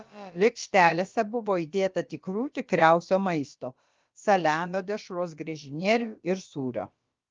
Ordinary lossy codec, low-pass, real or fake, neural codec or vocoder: Opus, 32 kbps; 7.2 kHz; fake; codec, 16 kHz, about 1 kbps, DyCAST, with the encoder's durations